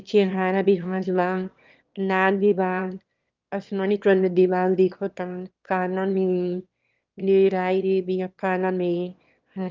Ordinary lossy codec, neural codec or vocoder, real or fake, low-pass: Opus, 24 kbps; autoencoder, 22.05 kHz, a latent of 192 numbers a frame, VITS, trained on one speaker; fake; 7.2 kHz